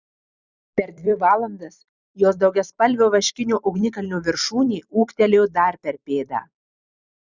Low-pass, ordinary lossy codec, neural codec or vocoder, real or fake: 7.2 kHz; Opus, 64 kbps; vocoder, 44.1 kHz, 128 mel bands every 256 samples, BigVGAN v2; fake